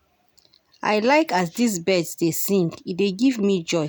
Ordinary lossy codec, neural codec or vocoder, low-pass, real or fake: none; none; none; real